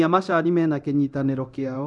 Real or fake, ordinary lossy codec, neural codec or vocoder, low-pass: fake; none; codec, 24 kHz, 0.9 kbps, DualCodec; none